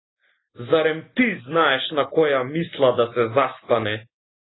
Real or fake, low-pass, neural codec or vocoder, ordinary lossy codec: real; 7.2 kHz; none; AAC, 16 kbps